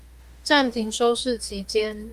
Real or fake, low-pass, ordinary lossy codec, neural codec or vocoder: fake; 14.4 kHz; Opus, 32 kbps; autoencoder, 48 kHz, 32 numbers a frame, DAC-VAE, trained on Japanese speech